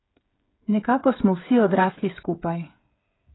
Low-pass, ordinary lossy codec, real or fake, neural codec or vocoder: 7.2 kHz; AAC, 16 kbps; fake; codec, 16 kHz, 8 kbps, FreqCodec, smaller model